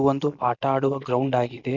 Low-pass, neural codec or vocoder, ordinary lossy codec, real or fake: 7.2 kHz; none; none; real